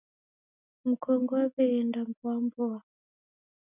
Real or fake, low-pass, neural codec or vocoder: real; 3.6 kHz; none